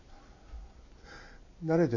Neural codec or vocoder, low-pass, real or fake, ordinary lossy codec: none; 7.2 kHz; real; none